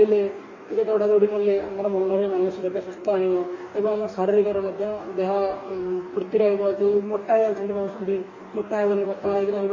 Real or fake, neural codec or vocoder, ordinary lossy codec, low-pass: fake; codec, 44.1 kHz, 2.6 kbps, DAC; MP3, 32 kbps; 7.2 kHz